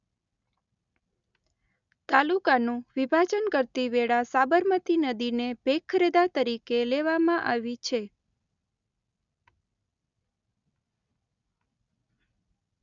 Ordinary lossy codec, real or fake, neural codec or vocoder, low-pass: none; real; none; 7.2 kHz